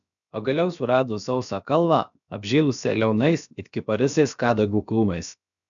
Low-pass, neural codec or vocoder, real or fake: 7.2 kHz; codec, 16 kHz, about 1 kbps, DyCAST, with the encoder's durations; fake